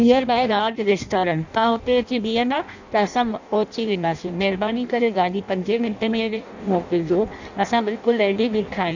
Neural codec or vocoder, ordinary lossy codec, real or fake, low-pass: codec, 16 kHz in and 24 kHz out, 0.6 kbps, FireRedTTS-2 codec; none; fake; 7.2 kHz